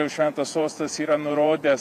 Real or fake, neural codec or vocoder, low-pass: fake; vocoder, 48 kHz, 128 mel bands, Vocos; 14.4 kHz